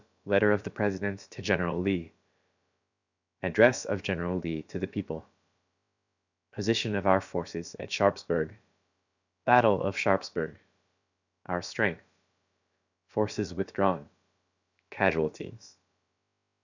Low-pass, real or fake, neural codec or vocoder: 7.2 kHz; fake; codec, 16 kHz, about 1 kbps, DyCAST, with the encoder's durations